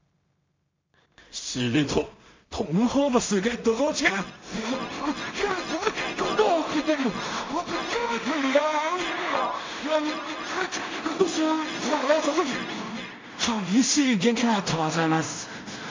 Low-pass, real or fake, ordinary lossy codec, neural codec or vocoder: 7.2 kHz; fake; MP3, 64 kbps; codec, 16 kHz in and 24 kHz out, 0.4 kbps, LongCat-Audio-Codec, two codebook decoder